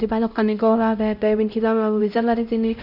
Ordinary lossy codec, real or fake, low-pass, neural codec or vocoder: none; fake; 5.4 kHz; codec, 16 kHz, 0.5 kbps, X-Codec, HuBERT features, trained on LibriSpeech